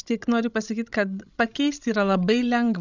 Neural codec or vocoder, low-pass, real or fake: codec, 16 kHz, 16 kbps, FreqCodec, larger model; 7.2 kHz; fake